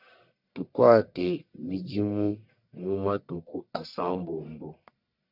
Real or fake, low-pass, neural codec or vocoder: fake; 5.4 kHz; codec, 44.1 kHz, 1.7 kbps, Pupu-Codec